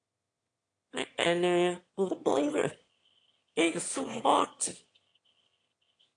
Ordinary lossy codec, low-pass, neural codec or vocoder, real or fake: AAC, 48 kbps; 9.9 kHz; autoencoder, 22.05 kHz, a latent of 192 numbers a frame, VITS, trained on one speaker; fake